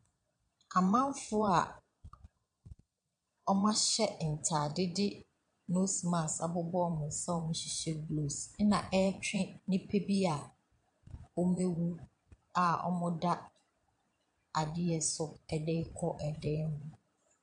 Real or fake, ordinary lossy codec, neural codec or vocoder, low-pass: fake; MP3, 64 kbps; vocoder, 22.05 kHz, 80 mel bands, Vocos; 9.9 kHz